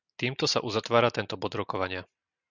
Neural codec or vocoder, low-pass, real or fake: none; 7.2 kHz; real